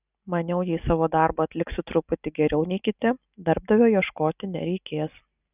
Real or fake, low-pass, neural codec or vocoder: real; 3.6 kHz; none